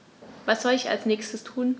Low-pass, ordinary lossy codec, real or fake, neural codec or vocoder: none; none; real; none